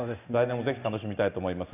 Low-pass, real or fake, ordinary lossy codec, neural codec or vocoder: 3.6 kHz; real; none; none